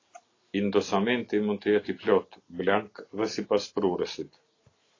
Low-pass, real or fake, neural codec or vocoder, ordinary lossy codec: 7.2 kHz; real; none; AAC, 32 kbps